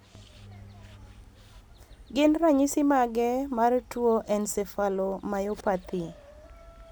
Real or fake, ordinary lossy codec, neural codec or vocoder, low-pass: real; none; none; none